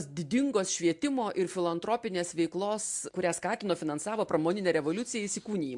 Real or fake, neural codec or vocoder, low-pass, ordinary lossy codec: real; none; 10.8 kHz; MP3, 64 kbps